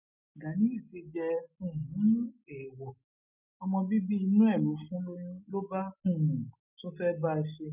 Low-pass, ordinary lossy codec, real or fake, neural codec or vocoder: 3.6 kHz; none; real; none